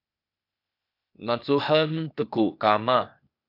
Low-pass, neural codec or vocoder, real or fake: 5.4 kHz; codec, 16 kHz, 0.8 kbps, ZipCodec; fake